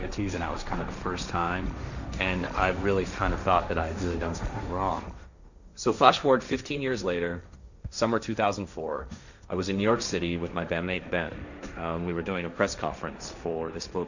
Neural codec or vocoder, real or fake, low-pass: codec, 16 kHz, 1.1 kbps, Voila-Tokenizer; fake; 7.2 kHz